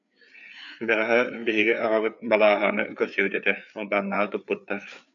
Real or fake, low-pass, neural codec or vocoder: fake; 7.2 kHz; codec, 16 kHz, 4 kbps, FreqCodec, larger model